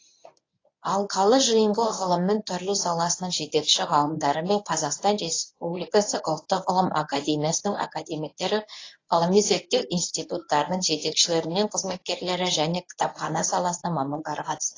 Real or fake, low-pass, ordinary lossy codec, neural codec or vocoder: fake; 7.2 kHz; AAC, 32 kbps; codec, 24 kHz, 0.9 kbps, WavTokenizer, medium speech release version 1